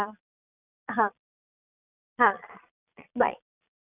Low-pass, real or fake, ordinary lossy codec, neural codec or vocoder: 3.6 kHz; fake; none; vocoder, 22.05 kHz, 80 mel bands, Vocos